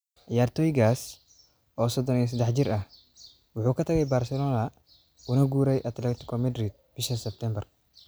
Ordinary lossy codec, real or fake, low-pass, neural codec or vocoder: none; real; none; none